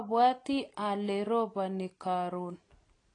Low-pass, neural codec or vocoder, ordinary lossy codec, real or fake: 9.9 kHz; none; AAC, 32 kbps; real